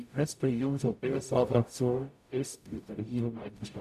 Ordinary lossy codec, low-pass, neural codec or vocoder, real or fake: none; 14.4 kHz; codec, 44.1 kHz, 0.9 kbps, DAC; fake